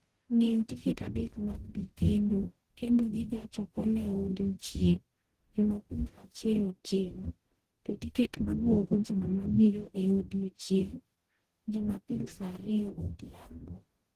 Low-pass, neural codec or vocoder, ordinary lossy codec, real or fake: 14.4 kHz; codec, 44.1 kHz, 0.9 kbps, DAC; Opus, 16 kbps; fake